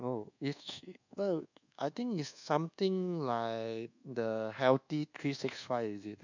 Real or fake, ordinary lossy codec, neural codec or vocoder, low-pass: fake; none; codec, 24 kHz, 1.2 kbps, DualCodec; 7.2 kHz